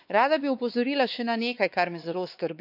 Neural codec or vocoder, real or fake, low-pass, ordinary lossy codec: codec, 16 kHz, 4 kbps, X-Codec, WavLM features, trained on Multilingual LibriSpeech; fake; 5.4 kHz; none